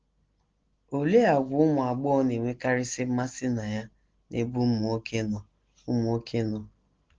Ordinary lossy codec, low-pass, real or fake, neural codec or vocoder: Opus, 16 kbps; 7.2 kHz; real; none